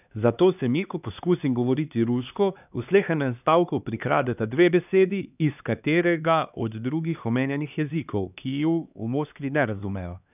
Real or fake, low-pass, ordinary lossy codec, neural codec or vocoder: fake; 3.6 kHz; none; codec, 16 kHz, 2 kbps, X-Codec, HuBERT features, trained on LibriSpeech